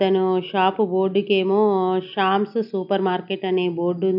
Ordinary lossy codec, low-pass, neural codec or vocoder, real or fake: none; 5.4 kHz; none; real